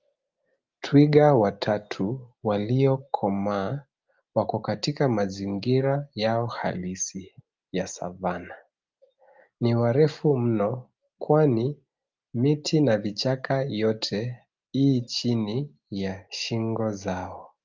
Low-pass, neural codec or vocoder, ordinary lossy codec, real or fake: 7.2 kHz; none; Opus, 24 kbps; real